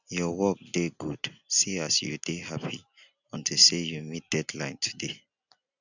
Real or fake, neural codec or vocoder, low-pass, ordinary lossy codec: real; none; 7.2 kHz; none